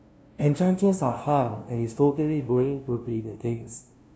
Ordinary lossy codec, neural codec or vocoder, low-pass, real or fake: none; codec, 16 kHz, 0.5 kbps, FunCodec, trained on LibriTTS, 25 frames a second; none; fake